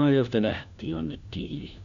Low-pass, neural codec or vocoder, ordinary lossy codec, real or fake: 7.2 kHz; codec, 16 kHz, 1 kbps, FunCodec, trained on LibriTTS, 50 frames a second; none; fake